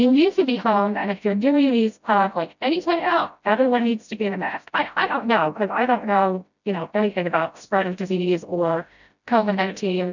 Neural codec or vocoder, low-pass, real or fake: codec, 16 kHz, 0.5 kbps, FreqCodec, smaller model; 7.2 kHz; fake